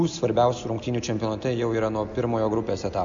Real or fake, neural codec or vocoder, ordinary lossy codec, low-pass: real; none; MP3, 64 kbps; 7.2 kHz